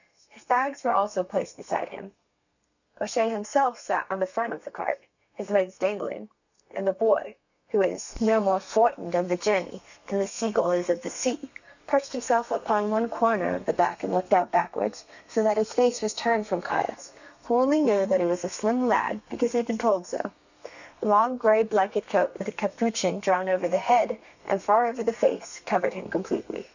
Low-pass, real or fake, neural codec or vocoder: 7.2 kHz; fake; codec, 32 kHz, 1.9 kbps, SNAC